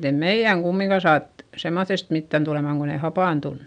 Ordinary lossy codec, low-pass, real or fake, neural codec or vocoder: none; 9.9 kHz; real; none